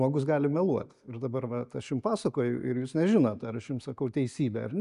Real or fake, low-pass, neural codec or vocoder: real; 10.8 kHz; none